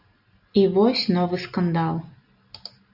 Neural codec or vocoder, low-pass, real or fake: none; 5.4 kHz; real